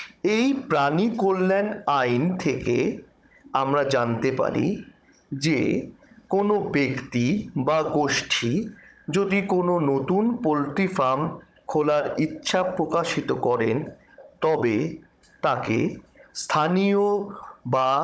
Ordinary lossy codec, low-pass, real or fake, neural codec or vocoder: none; none; fake; codec, 16 kHz, 16 kbps, FunCodec, trained on Chinese and English, 50 frames a second